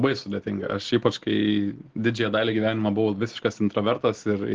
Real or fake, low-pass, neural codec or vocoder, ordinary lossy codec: real; 7.2 kHz; none; Opus, 16 kbps